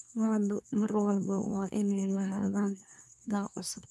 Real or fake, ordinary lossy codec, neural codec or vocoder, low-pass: fake; none; codec, 24 kHz, 1 kbps, SNAC; none